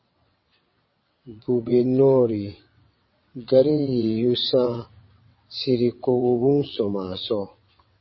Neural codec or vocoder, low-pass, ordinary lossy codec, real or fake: vocoder, 22.05 kHz, 80 mel bands, WaveNeXt; 7.2 kHz; MP3, 24 kbps; fake